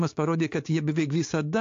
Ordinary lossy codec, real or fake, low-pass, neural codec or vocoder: AAC, 48 kbps; fake; 7.2 kHz; codec, 16 kHz, 6 kbps, DAC